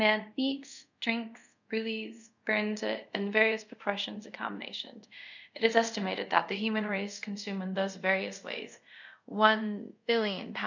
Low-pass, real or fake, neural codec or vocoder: 7.2 kHz; fake; codec, 24 kHz, 0.5 kbps, DualCodec